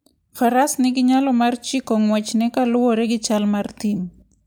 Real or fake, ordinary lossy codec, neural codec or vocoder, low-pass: real; none; none; none